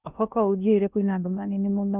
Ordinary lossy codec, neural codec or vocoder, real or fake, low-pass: none; codec, 16 kHz in and 24 kHz out, 0.6 kbps, FocalCodec, streaming, 2048 codes; fake; 3.6 kHz